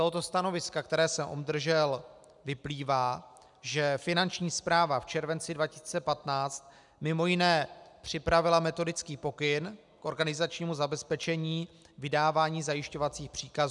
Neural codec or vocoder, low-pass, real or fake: none; 10.8 kHz; real